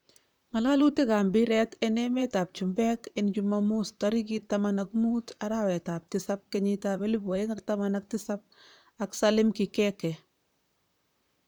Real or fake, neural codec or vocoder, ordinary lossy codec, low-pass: fake; vocoder, 44.1 kHz, 128 mel bands, Pupu-Vocoder; none; none